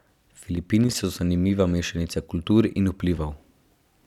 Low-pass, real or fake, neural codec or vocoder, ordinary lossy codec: 19.8 kHz; fake; vocoder, 44.1 kHz, 128 mel bands every 256 samples, BigVGAN v2; none